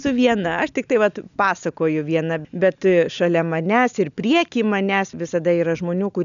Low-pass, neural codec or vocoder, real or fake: 7.2 kHz; none; real